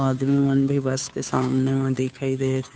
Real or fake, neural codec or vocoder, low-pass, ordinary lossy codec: fake; codec, 16 kHz, 2 kbps, FunCodec, trained on Chinese and English, 25 frames a second; none; none